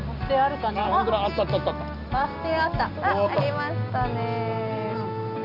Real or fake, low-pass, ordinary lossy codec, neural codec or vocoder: real; 5.4 kHz; none; none